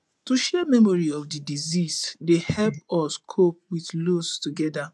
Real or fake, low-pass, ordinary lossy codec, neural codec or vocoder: fake; none; none; vocoder, 24 kHz, 100 mel bands, Vocos